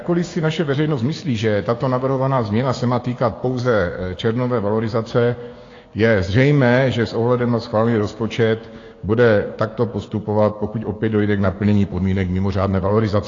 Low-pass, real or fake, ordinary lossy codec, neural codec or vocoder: 7.2 kHz; fake; AAC, 32 kbps; codec, 16 kHz, 6 kbps, DAC